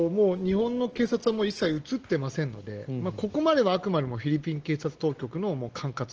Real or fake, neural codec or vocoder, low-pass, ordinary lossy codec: real; none; 7.2 kHz; Opus, 16 kbps